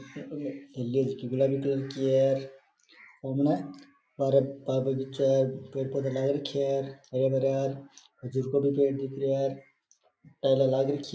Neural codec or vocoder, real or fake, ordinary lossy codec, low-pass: none; real; none; none